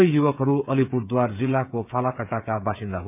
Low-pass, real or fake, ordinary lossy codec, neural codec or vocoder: 3.6 kHz; fake; none; codec, 16 kHz, 8 kbps, FreqCodec, smaller model